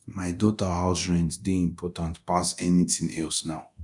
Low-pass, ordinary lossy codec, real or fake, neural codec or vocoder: 10.8 kHz; none; fake; codec, 24 kHz, 0.9 kbps, DualCodec